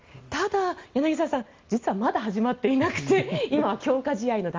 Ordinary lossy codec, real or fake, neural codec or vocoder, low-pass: Opus, 32 kbps; real; none; 7.2 kHz